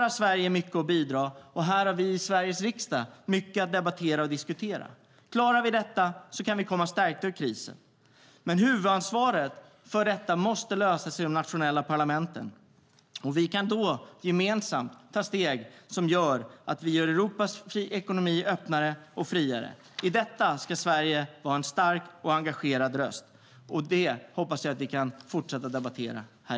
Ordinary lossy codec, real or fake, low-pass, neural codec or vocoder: none; real; none; none